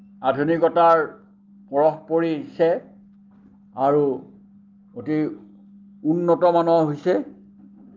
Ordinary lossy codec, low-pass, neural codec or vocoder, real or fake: Opus, 24 kbps; 7.2 kHz; none; real